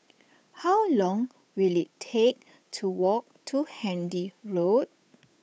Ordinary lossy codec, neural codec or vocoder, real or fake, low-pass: none; codec, 16 kHz, 8 kbps, FunCodec, trained on Chinese and English, 25 frames a second; fake; none